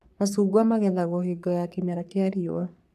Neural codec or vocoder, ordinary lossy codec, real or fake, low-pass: codec, 44.1 kHz, 3.4 kbps, Pupu-Codec; none; fake; 14.4 kHz